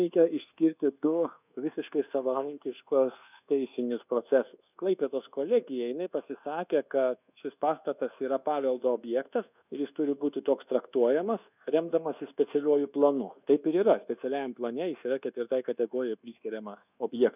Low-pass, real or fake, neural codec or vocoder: 3.6 kHz; fake; codec, 24 kHz, 1.2 kbps, DualCodec